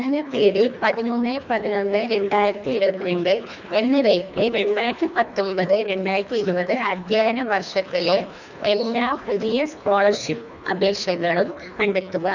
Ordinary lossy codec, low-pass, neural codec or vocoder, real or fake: none; 7.2 kHz; codec, 24 kHz, 1.5 kbps, HILCodec; fake